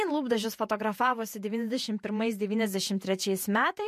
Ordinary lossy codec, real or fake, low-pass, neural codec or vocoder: MP3, 64 kbps; fake; 14.4 kHz; vocoder, 48 kHz, 128 mel bands, Vocos